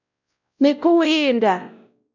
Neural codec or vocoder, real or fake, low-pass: codec, 16 kHz, 0.5 kbps, X-Codec, WavLM features, trained on Multilingual LibriSpeech; fake; 7.2 kHz